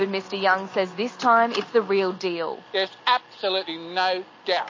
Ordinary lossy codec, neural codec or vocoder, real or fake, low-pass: MP3, 32 kbps; none; real; 7.2 kHz